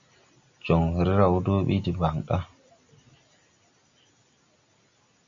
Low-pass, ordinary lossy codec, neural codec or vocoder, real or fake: 7.2 kHz; Opus, 64 kbps; none; real